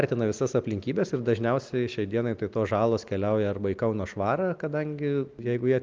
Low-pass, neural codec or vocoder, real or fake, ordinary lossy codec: 7.2 kHz; none; real; Opus, 32 kbps